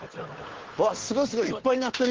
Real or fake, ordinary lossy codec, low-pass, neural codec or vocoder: fake; Opus, 16 kbps; 7.2 kHz; codec, 16 kHz, 2 kbps, FunCodec, trained on Chinese and English, 25 frames a second